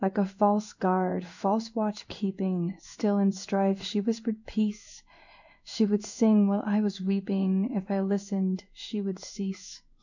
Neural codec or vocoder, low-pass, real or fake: codec, 16 kHz in and 24 kHz out, 1 kbps, XY-Tokenizer; 7.2 kHz; fake